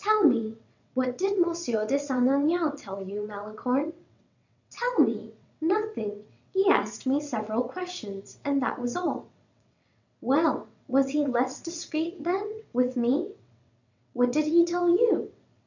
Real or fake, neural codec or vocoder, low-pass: fake; vocoder, 22.05 kHz, 80 mel bands, WaveNeXt; 7.2 kHz